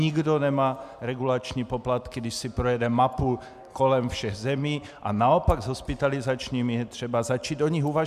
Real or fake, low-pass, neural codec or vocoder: real; 14.4 kHz; none